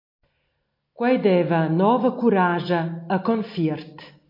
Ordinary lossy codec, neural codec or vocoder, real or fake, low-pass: MP3, 32 kbps; none; real; 5.4 kHz